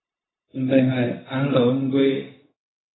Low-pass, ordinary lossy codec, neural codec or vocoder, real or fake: 7.2 kHz; AAC, 16 kbps; codec, 16 kHz, 0.4 kbps, LongCat-Audio-Codec; fake